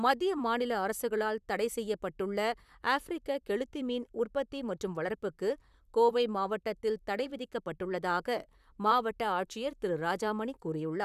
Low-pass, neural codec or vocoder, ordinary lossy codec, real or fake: 14.4 kHz; vocoder, 44.1 kHz, 128 mel bands, Pupu-Vocoder; none; fake